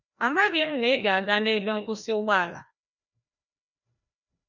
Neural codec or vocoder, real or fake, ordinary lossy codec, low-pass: codec, 16 kHz, 1 kbps, FreqCodec, larger model; fake; none; 7.2 kHz